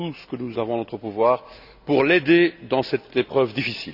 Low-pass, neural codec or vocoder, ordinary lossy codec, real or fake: 5.4 kHz; none; none; real